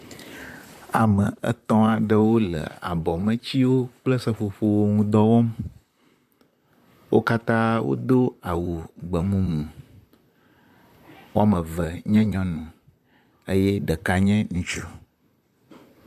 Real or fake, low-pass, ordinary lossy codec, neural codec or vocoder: fake; 14.4 kHz; MP3, 96 kbps; vocoder, 44.1 kHz, 128 mel bands, Pupu-Vocoder